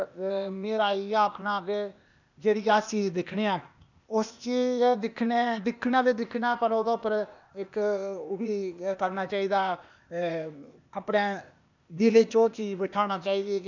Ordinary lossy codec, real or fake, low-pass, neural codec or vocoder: none; fake; 7.2 kHz; codec, 16 kHz, 0.8 kbps, ZipCodec